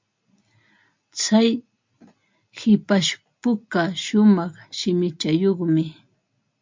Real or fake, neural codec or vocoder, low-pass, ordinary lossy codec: real; none; 7.2 kHz; MP3, 48 kbps